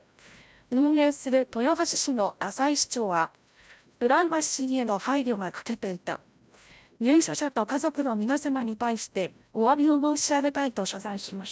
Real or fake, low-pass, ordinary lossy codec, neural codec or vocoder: fake; none; none; codec, 16 kHz, 0.5 kbps, FreqCodec, larger model